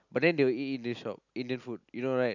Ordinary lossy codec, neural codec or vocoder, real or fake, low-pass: none; none; real; 7.2 kHz